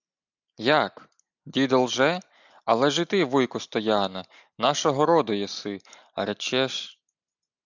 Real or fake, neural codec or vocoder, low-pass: real; none; 7.2 kHz